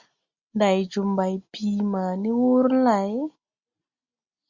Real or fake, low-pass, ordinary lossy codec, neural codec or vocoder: real; 7.2 kHz; Opus, 64 kbps; none